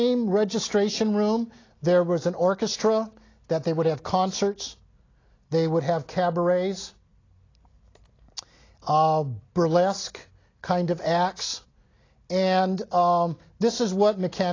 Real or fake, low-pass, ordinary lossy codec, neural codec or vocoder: real; 7.2 kHz; AAC, 32 kbps; none